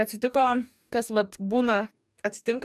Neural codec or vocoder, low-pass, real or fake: codec, 44.1 kHz, 2.6 kbps, DAC; 14.4 kHz; fake